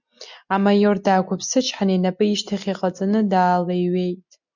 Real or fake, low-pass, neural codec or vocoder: real; 7.2 kHz; none